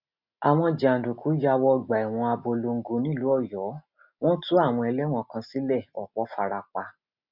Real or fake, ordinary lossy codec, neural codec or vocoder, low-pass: real; none; none; 5.4 kHz